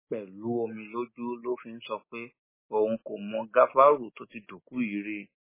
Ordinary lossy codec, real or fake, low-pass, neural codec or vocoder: MP3, 16 kbps; real; 3.6 kHz; none